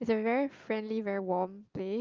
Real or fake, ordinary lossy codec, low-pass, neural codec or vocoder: real; Opus, 24 kbps; 7.2 kHz; none